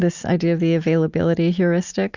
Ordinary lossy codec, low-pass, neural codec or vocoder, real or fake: Opus, 64 kbps; 7.2 kHz; none; real